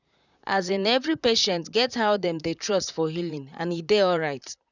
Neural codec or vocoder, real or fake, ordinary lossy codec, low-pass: vocoder, 44.1 kHz, 128 mel bands, Pupu-Vocoder; fake; none; 7.2 kHz